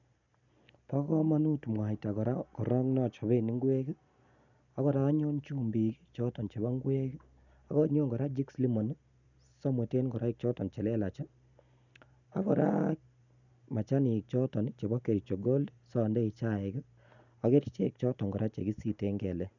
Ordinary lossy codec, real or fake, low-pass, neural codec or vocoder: none; real; 7.2 kHz; none